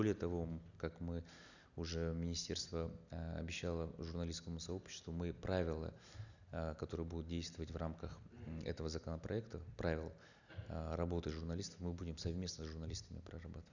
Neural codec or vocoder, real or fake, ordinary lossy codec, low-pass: none; real; none; 7.2 kHz